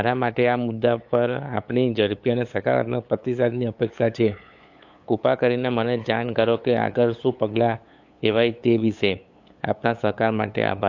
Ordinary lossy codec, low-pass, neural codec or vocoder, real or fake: AAC, 48 kbps; 7.2 kHz; codec, 16 kHz, 8 kbps, FunCodec, trained on LibriTTS, 25 frames a second; fake